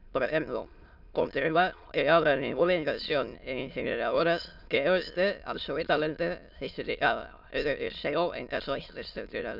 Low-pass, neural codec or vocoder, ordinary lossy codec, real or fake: 5.4 kHz; autoencoder, 22.05 kHz, a latent of 192 numbers a frame, VITS, trained on many speakers; none; fake